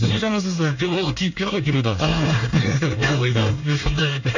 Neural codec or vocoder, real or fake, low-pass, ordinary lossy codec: codec, 24 kHz, 1 kbps, SNAC; fake; 7.2 kHz; none